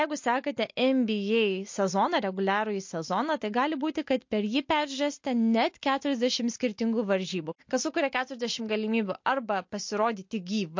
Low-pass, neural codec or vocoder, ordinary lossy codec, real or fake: 7.2 kHz; none; MP3, 48 kbps; real